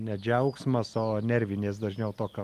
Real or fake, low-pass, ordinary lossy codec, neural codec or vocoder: real; 14.4 kHz; Opus, 32 kbps; none